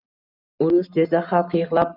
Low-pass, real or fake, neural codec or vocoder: 5.4 kHz; real; none